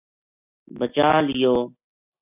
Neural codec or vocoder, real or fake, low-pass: none; real; 3.6 kHz